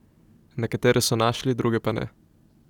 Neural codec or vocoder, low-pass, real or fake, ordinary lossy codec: none; 19.8 kHz; real; none